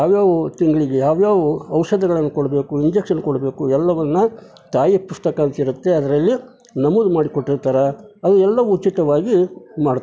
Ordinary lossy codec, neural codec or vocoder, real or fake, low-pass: none; none; real; none